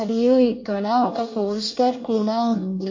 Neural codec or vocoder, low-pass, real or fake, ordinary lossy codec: codec, 24 kHz, 1 kbps, SNAC; 7.2 kHz; fake; MP3, 32 kbps